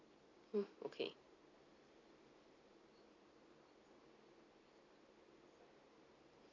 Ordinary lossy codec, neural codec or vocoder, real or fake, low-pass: none; none; real; 7.2 kHz